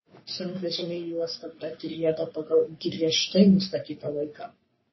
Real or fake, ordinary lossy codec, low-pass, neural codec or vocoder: fake; MP3, 24 kbps; 7.2 kHz; codec, 44.1 kHz, 3.4 kbps, Pupu-Codec